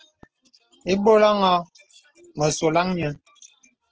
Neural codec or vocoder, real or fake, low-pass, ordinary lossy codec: none; real; 7.2 kHz; Opus, 16 kbps